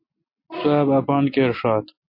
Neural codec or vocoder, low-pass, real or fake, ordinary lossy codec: none; 5.4 kHz; real; MP3, 32 kbps